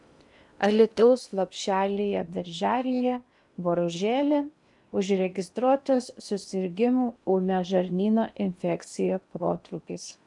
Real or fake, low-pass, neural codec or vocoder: fake; 10.8 kHz; codec, 16 kHz in and 24 kHz out, 0.8 kbps, FocalCodec, streaming, 65536 codes